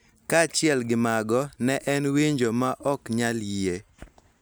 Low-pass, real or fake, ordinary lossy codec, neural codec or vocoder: none; real; none; none